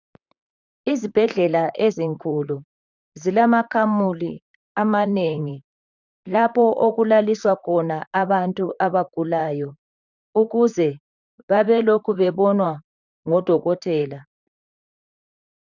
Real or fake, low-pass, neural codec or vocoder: fake; 7.2 kHz; vocoder, 44.1 kHz, 128 mel bands, Pupu-Vocoder